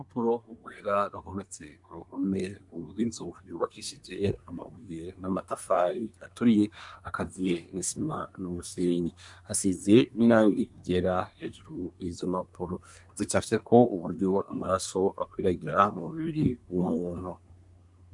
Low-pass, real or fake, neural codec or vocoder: 10.8 kHz; fake; codec, 24 kHz, 1 kbps, SNAC